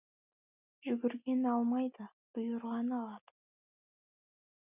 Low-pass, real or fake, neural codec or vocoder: 3.6 kHz; real; none